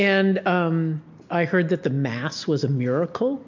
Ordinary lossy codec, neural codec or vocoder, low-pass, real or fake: MP3, 48 kbps; none; 7.2 kHz; real